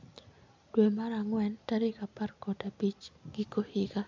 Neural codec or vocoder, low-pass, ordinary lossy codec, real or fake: none; 7.2 kHz; MP3, 64 kbps; real